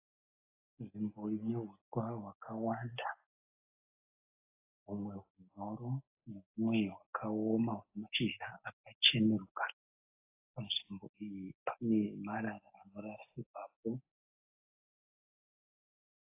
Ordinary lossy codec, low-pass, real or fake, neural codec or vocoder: AAC, 24 kbps; 3.6 kHz; real; none